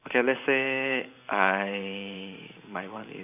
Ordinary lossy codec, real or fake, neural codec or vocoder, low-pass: none; fake; vocoder, 44.1 kHz, 128 mel bands every 512 samples, BigVGAN v2; 3.6 kHz